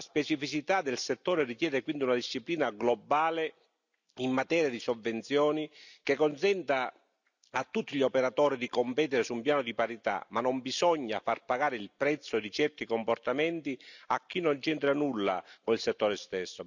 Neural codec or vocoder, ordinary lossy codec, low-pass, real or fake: none; none; 7.2 kHz; real